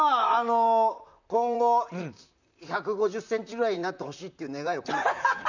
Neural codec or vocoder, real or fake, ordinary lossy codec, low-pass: vocoder, 44.1 kHz, 128 mel bands, Pupu-Vocoder; fake; none; 7.2 kHz